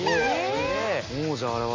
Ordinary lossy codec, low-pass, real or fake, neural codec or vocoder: MP3, 32 kbps; 7.2 kHz; real; none